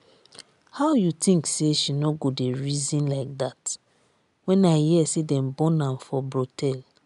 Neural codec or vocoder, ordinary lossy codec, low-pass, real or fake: none; MP3, 96 kbps; 10.8 kHz; real